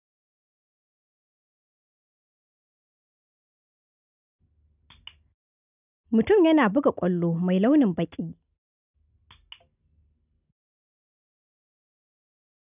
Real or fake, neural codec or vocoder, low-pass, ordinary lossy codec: real; none; 3.6 kHz; none